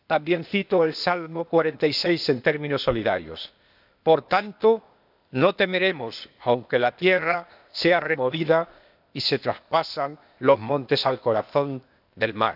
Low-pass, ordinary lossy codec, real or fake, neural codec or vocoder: 5.4 kHz; none; fake; codec, 16 kHz, 0.8 kbps, ZipCodec